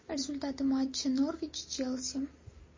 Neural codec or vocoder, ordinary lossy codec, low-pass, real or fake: none; MP3, 32 kbps; 7.2 kHz; real